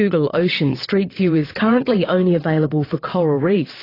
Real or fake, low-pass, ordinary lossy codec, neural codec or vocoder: fake; 5.4 kHz; AAC, 24 kbps; vocoder, 22.05 kHz, 80 mel bands, WaveNeXt